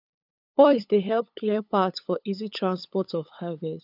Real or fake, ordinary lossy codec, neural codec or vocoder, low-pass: fake; none; codec, 16 kHz, 8 kbps, FunCodec, trained on LibriTTS, 25 frames a second; 5.4 kHz